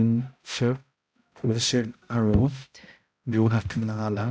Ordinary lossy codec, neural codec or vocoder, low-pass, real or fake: none; codec, 16 kHz, 0.5 kbps, X-Codec, HuBERT features, trained on balanced general audio; none; fake